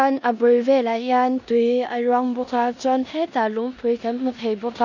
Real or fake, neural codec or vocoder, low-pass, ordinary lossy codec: fake; codec, 16 kHz in and 24 kHz out, 0.9 kbps, LongCat-Audio-Codec, four codebook decoder; 7.2 kHz; none